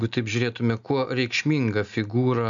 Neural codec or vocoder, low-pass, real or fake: none; 7.2 kHz; real